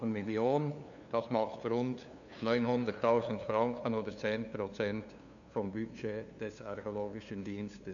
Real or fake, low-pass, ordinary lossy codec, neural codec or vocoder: fake; 7.2 kHz; none; codec, 16 kHz, 2 kbps, FunCodec, trained on LibriTTS, 25 frames a second